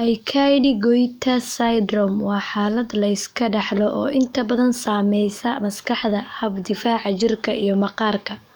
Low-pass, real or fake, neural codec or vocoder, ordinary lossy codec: none; fake; codec, 44.1 kHz, 7.8 kbps, DAC; none